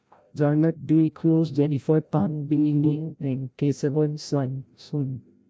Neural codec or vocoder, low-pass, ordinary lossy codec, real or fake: codec, 16 kHz, 0.5 kbps, FreqCodec, larger model; none; none; fake